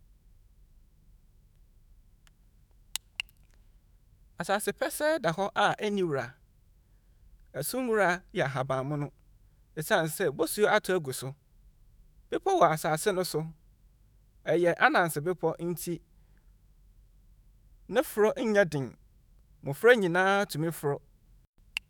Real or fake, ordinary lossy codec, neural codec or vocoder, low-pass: fake; none; autoencoder, 48 kHz, 128 numbers a frame, DAC-VAE, trained on Japanese speech; none